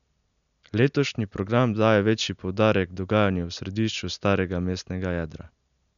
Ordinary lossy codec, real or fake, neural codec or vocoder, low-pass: none; real; none; 7.2 kHz